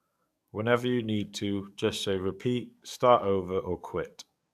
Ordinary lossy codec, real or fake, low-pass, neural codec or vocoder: AAC, 96 kbps; fake; 14.4 kHz; codec, 44.1 kHz, 7.8 kbps, DAC